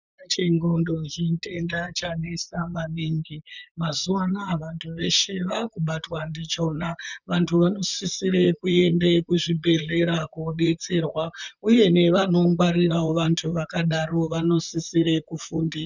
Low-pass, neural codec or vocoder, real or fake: 7.2 kHz; vocoder, 44.1 kHz, 128 mel bands, Pupu-Vocoder; fake